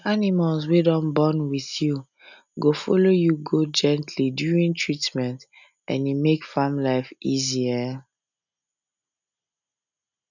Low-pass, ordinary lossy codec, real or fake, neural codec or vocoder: 7.2 kHz; none; real; none